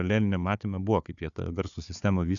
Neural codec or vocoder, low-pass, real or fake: codec, 16 kHz, 4 kbps, FunCodec, trained on Chinese and English, 50 frames a second; 7.2 kHz; fake